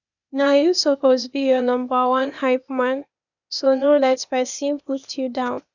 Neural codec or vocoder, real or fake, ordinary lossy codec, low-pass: codec, 16 kHz, 0.8 kbps, ZipCodec; fake; none; 7.2 kHz